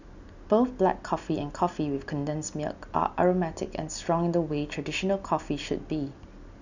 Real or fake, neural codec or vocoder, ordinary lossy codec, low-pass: real; none; none; 7.2 kHz